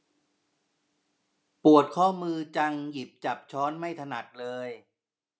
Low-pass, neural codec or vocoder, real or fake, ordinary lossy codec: none; none; real; none